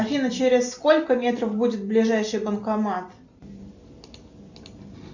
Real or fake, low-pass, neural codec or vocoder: real; 7.2 kHz; none